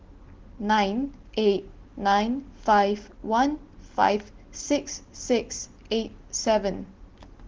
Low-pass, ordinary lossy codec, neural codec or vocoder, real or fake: 7.2 kHz; Opus, 16 kbps; none; real